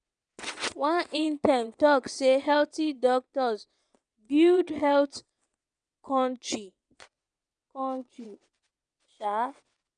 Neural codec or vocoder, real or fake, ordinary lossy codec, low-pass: vocoder, 22.05 kHz, 80 mel bands, WaveNeXt; fake; none; 9.9 kHz